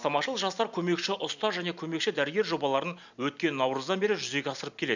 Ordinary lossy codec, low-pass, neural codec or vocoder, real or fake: none; 7.2 kHz; none; real